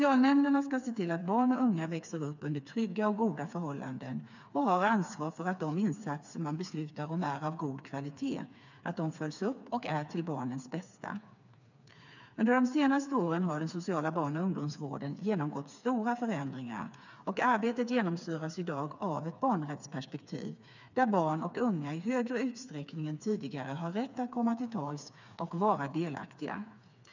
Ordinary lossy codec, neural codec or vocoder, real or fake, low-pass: none; codec, 16 kHz, 4 kbps, FreqCodec, smaller model; fake; 7.2 kHz